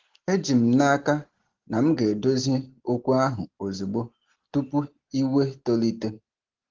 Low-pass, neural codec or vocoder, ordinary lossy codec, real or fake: 7.2 kHz; none; Opus, 16 kbps; real